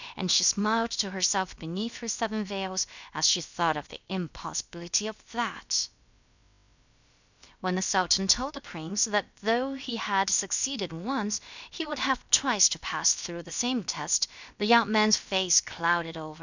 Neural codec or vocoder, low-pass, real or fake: codec, 16 kHz, about 1 kbps, DyCAST, with the encoder's durations; 7.2 kHz; fake